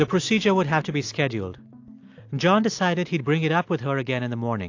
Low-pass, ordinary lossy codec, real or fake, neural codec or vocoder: 7.2 kHz; AAC, 48 kbps; real; none